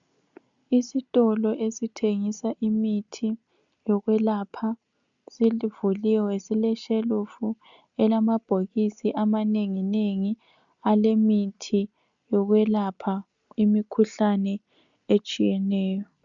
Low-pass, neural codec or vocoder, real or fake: 7.2 kHz; none; real